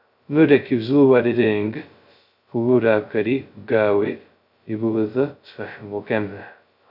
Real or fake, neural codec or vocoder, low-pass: fake; codec, 16 kHz, 0.2 kbps, FocalCodec; 5.4 kHz